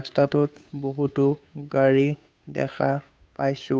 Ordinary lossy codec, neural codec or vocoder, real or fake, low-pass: none; codec, 16 kHz, 2 kbps, FunCodec, trained on Chinese and English, 25 frames a second; fake; none